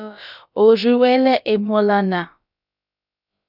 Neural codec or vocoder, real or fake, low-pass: codec, 16 kHz, about 1 kbps, DyCAST, with the encoder's durations; fake; 5.4 kHz